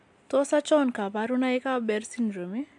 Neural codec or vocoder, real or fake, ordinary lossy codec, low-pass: none; real; AAC, 64 kbps; 10.8 kHz